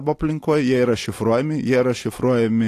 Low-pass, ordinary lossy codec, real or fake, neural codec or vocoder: 14.4 kHz; AAC, 48 kbps; real; none